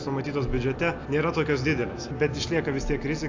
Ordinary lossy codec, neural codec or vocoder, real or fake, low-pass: AAC, 48 kbps; none; real; 7.2 kHz